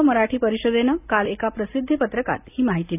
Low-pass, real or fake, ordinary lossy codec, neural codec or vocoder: 3.6 kHz; real; none; none